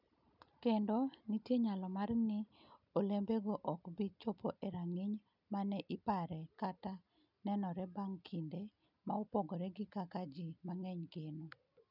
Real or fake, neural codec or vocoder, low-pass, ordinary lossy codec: real; none; 5.4 kHz; none